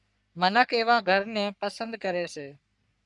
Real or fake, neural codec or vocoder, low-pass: fake; codec, 44.1 kHz, 3.4 kbps, Pupu-Codec; 10.8 kHz